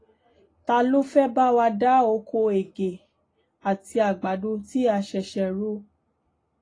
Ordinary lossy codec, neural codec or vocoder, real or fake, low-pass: AAC, 32 kbps; none; real; 9.9 kHz